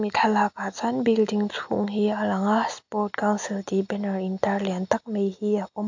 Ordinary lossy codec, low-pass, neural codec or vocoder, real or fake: AAC, 48 kbps; 7.2 kHz; none; real